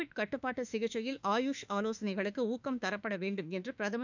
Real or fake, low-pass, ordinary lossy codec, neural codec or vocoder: fake; 7.2 kHz; none; autoencoder, 48 kHz, 32 numbers a frame, DAC-VAE, trained on Japanese speech